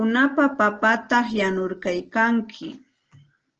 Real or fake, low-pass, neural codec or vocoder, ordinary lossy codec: real; 7.2 kHz; none; Opus, 16 kbps